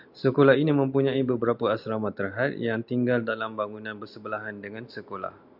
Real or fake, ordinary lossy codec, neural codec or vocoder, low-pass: real; MP3, 48 kbps; none; 5.4 kHz